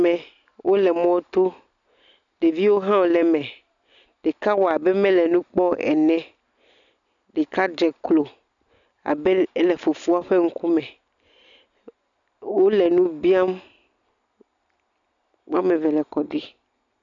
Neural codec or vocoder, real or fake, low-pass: none; real; 7.2 kHz